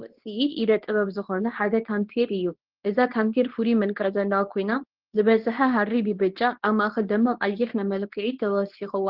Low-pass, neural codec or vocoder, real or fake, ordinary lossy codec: 5.4 kHz; codec, 24 kHz, 0.9 kbps, WavTokenizer, medium speech release version 2; fake; Opus, 16 kbps